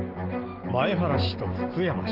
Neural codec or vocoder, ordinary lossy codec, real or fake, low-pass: none; Opus, 16 kbps; real; 5.4 kHz